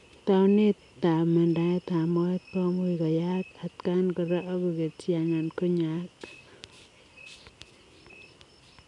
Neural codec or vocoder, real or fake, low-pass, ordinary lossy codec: none; real; 10.8 kHz; none